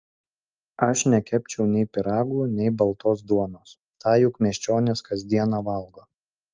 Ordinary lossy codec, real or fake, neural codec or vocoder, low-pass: Opus, 24 kbps; real; none; 7.2 kHz